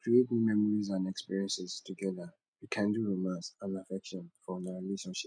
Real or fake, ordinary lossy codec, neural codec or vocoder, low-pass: real; none; none; 9.9 kHz